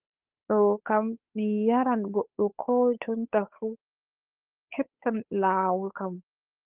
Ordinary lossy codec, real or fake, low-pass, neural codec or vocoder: Opus, 24 kbps; fake; 3.6 kHz; codec, 16 kHz, 8 kbps, FunCodec, trained on Chinese and English, 25 frames a second